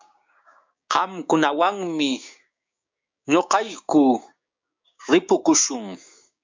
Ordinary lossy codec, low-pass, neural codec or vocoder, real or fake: MP3, 64 kbps; 7.2 kHz; codec, 16 kHz, 6 kbps, DAC; fake